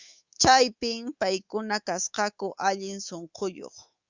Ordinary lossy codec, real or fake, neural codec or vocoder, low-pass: Opus, 64 kbps; fake; codec, 24 kHz, 3.1 kbps, DualCodec; 7.2 kHz